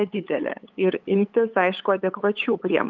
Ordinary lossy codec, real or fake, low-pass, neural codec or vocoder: Opus, 24 kbps; fake; 7.2 kHz; codec, 16 kHz, 8 kbps, FunCodec, trained on LibriTTS, 25 frames a second